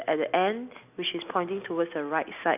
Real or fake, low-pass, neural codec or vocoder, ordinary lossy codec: real; 3.6 kHz; none; none